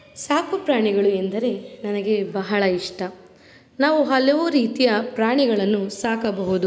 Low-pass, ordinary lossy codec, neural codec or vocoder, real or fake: none; none; none; real